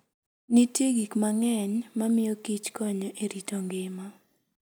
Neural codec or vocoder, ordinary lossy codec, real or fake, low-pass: none; none; real; none